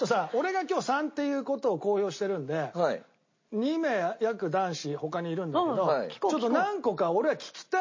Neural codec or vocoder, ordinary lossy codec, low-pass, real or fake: none; MP3, 32 kbps; 7.2 kHz; real